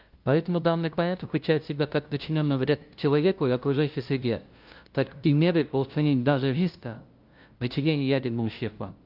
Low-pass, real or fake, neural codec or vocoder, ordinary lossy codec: 5.4 kHz; fake; codec, 16 kHz, 0.5 kbps, FunCodec, trained on LibriTTS, 25 frames a second; Opus, 24 kbps